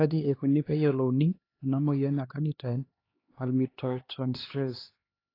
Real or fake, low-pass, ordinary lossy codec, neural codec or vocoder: fake; 5.4 kHz; AAC, 24 kbps; codec, 16 kHz, 2 kbps, X-Codec, HuBERT features, trained on LibriSpeech